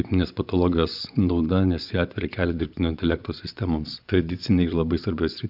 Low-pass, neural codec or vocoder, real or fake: 5.4 kHz; vocoder, 24 kHz, 100 mel bands, Vocos; fake